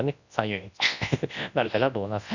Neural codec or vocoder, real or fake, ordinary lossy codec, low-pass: codec, 24 kHz, 0.9 kbps, WavTokenizer, large speech release; fake; none; 7.2 kHz